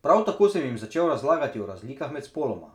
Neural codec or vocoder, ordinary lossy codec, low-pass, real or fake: none; none; 19.8 kHz; real